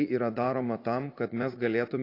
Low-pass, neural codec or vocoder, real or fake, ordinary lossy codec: 5.4 kHz; vocoder, 44.1 kHz, 80 mel bands, Vocos; fake; AAC, 32 kbps